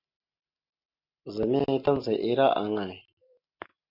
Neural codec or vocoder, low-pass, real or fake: none; 5.4 kHz; real